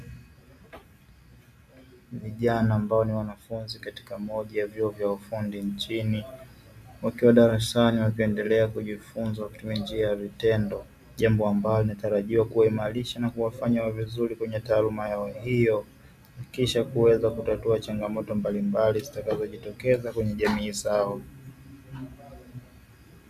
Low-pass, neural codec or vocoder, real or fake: 14.4 kHz; none; real